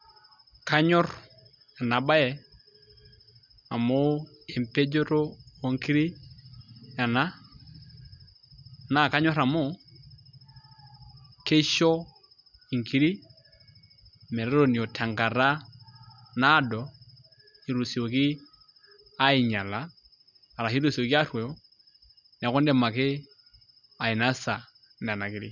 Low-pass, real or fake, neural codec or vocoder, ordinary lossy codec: 7.2 kHz; real; none; none